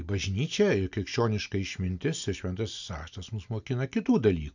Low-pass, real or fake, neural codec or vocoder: 7.2 kHz; real; none